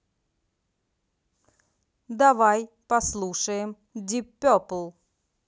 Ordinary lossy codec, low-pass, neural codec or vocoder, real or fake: none; none; none; real